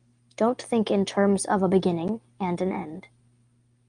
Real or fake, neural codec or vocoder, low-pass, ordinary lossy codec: real; none; 9.9 kHz; Opus, 32 kbps